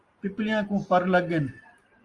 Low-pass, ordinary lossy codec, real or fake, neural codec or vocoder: 10.8 kHz; Opus, 32 kbps; real; none